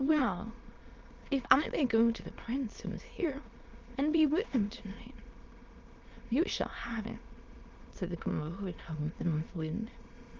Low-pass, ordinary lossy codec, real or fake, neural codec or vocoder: 7.2 kHz; Opus, 32 kbps; fake; autoencoder, 22.05 kHz, a latent of 192 numbers a frame, VITS, trained on many speakers